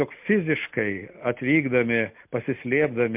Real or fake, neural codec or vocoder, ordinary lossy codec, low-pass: real; none; MP3, 32 kbps; 3.6 kHz